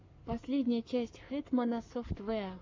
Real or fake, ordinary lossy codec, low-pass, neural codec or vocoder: fake; MP3, 48 kbps; 7.2 kHz; codec, 44.1 kHz, 7.8 kbps, Pupu-Codec